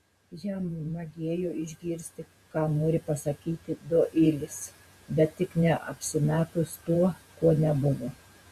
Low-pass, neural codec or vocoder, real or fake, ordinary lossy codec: 14.4 kHz; vocoder, 48 kHz, 128 mel bands, Vocos; fake; Opus, 64 kbps